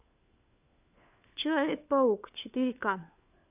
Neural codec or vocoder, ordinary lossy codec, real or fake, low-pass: codec, 16 kHz, 4 kbps, FunCodec, trained on LibriTTS, 50 frames a second; AAC, 32 kbps; fake; 3.6 kHz